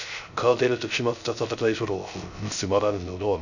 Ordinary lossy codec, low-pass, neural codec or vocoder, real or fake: none; 7.2 kHz; codec, 16 kHz, 0.3 kbps, FocalCodec; fake